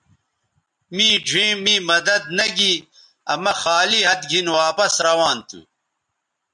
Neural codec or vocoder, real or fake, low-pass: none; real; 10.8 kHz